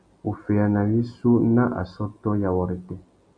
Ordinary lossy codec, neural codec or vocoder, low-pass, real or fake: AAC, 64 kbps; none; 9.9 kHz; real